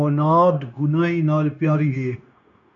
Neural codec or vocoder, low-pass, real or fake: codec, 16 kHz, 0.9 kbps, LongCat-Audio-Codec; 7.2 kHz; fake